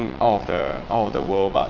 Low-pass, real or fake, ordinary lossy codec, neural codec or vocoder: 7.2 kHz; fake; none; vocoder, 22.05 kHz, 80 mel bands, WaveNeXt